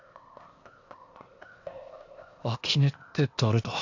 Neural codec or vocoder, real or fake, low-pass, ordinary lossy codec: codec, 16 kHz, 0.8 kbps, ZipCodec; fake; 7.2 kHz; AAC, 48 kbps